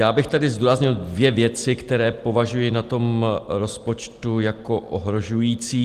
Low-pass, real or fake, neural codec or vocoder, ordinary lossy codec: 14.4 kHz; real; none; Opus, 24 kbps